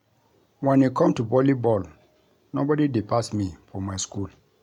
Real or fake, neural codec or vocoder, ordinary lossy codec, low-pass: real; none; none; 19.8 kHz